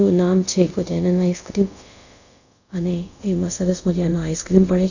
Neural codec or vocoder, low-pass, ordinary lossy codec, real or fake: codec, 24 kHz, 0.5 kbps, DualCodec; 7.2 kHz; none; fake